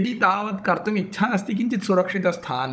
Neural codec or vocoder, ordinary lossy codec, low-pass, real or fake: codec, 16 kHz, 16 kbps, FunCodec, trained on Chinese and English, 50 frames a second; none; none; fake